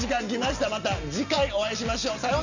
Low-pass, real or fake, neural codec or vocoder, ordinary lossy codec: 7.2 kHz; real; none; none